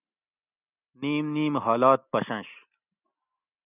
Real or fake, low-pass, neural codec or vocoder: real; 3.6 kHz; none